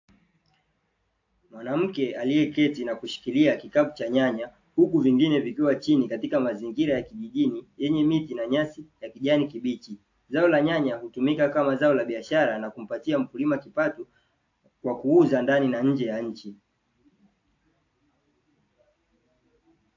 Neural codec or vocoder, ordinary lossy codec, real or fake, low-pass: none; AAC, 48 kbps; real; 7.2 kHz